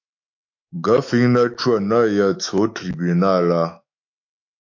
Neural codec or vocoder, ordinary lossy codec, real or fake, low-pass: autoencoder, 48 kHz, 128 numbers a frame, DAC-VAE, trained on Japanese speech; AAC, 48 kbps; fake; 7.2 kHz